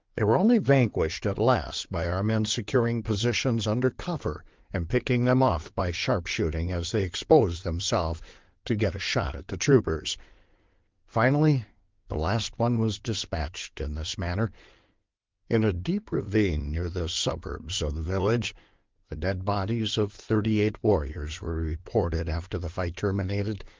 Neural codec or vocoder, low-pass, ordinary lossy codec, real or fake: codec, 16 kHz in and 24 kHz out, 2.2 kbps, FireRedTTS-2 codec; 7.2 kHz; Opus, 32 kbps; fake